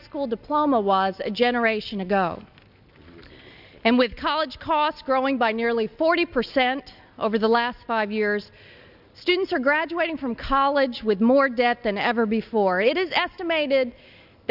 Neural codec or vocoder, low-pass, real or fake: none; 5.4 kHz; real